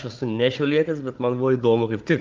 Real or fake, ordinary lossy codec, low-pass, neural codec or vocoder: fake; Opus, 24 kbps; 7.2 kHz; codec, 16 kHz, 16 kbps, FunCodec, trained on Chinese and English, 50 frames a second